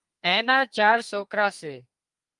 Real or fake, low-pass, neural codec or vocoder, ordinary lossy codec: fake; 10.8 kHz; codec, 32 kHz, 1.9 kbps, SNAC; Opus, 32 kbps